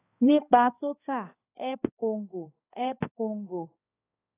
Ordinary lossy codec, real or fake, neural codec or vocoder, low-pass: AAC, 16 kbps; fake; codec, 16 kHz, 2 kbps, X-Codec, HuBERT features, trained on balanced general audio; 3.6 kHz